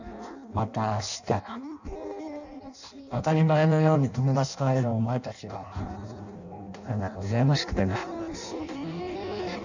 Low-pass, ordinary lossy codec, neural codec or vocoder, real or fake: 7.2 kHz; none; codec, 16 kHz in and 24 kHz out, 0.6 kbps, FireRedTTS-2 codec; fake